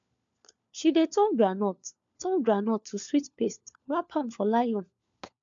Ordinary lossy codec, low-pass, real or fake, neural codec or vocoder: MP3, 64 kbps; 7.2 kHz; fake; codec, 16 kHz, 4 kbps, FunCodec, trained on LibriTTS, 50 frames a second